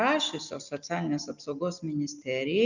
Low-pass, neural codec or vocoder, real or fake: 7.2 kHz; none; real